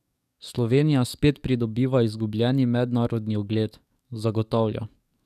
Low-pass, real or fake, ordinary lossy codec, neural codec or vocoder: 14.4 kHz; fake; none; codec, 44.1 kHz, 7.8 kbps, DAC